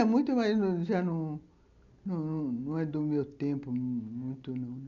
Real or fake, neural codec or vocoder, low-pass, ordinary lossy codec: real; none; 7.2 kHz; none